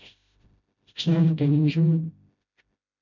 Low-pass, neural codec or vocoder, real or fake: 7.2 kHz; codec, 16 kHz, 0.5 kbps, FreqCodec, smaller model; fake